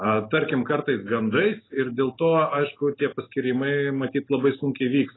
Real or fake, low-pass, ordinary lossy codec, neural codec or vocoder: real; 7.2 kHz; AAC, 16 kbps; none